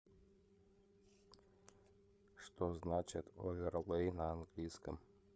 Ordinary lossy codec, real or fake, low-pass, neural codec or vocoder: none; fake; none; codec, 16 kHz, 8 kbps, FreqCodec, larger model